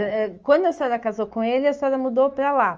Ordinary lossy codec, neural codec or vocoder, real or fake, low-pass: Opus, 24 kbps; autoencoder, 48 kHz, 128 numbers a frame, DAC-VAE, trained on Japanese speech; fake; 7.2 kHz